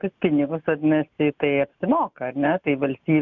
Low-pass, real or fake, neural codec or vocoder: 7.2 kHz; real; none